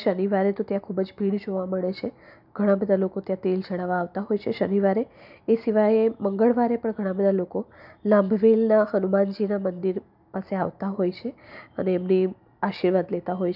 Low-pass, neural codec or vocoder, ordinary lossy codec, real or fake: 5.4 kHz; none; none; real